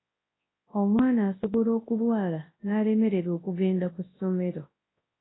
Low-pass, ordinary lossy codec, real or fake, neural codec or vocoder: 7.2 kHz; AAC, 16 kbps; fake; codec, 24 kHz, 0.9 kbps, WavTokenizer, large speech release